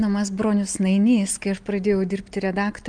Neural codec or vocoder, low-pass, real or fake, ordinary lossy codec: none; 9.9 kHz; real; Opus, 32 kbps